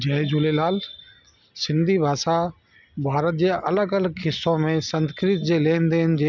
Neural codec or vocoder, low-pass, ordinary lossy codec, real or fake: none; 7.2 kHz; none; real